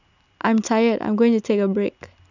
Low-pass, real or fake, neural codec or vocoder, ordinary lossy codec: 7.2 kHz; real; none; none